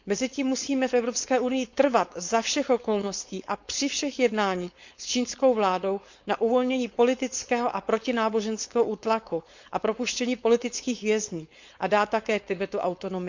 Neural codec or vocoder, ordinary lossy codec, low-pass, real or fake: codec, 16 kHz, 4.8 kbps, FACodec; Opus, 64 kbps; 7.2 kHz; fake